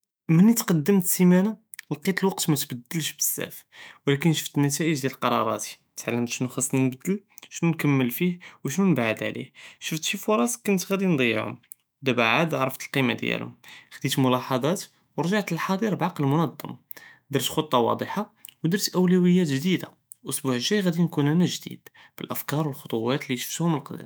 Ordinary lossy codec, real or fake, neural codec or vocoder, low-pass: none; fake; autoencoder, 48 kHz, 128 numbers a frame, DAC-VAE, trained on Japanese speech; none